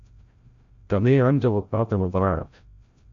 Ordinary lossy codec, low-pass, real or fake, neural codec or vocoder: AAC, 64 kbps; 7.2 kHz; fake; codec, 16 kHz, 0.5 kbps, FreqCodec, larger model